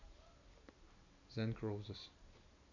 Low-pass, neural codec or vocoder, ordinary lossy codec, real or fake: 7.2 kHz; none; none; real